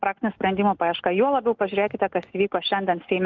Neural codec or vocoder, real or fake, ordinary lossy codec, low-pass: none; real; Opus, 32 kbps; 7.2 kHz